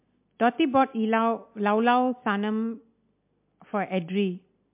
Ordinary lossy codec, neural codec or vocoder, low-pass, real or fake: MP3, 32 kbps; none; 3.6 kHz; real